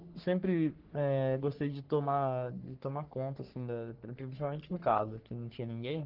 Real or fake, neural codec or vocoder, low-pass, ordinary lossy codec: fake; codec, 44.1 kHz, 3.4 kbps, Pupu-Codec; 5.4 kHz; Opus, 16 kbps